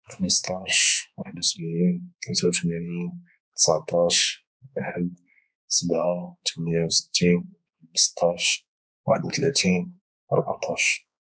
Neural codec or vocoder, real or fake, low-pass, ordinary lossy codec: codec, 16 kHz, 4 kbps, X-Codec, HuBERT features, trained on balanced general audio; fake; none; none